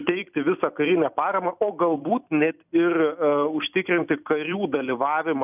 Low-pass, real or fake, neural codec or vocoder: 3.6 kHz; fake; vocoder, 44.1 kHz, 128 mel bands every 256 samples, BigVGAN v2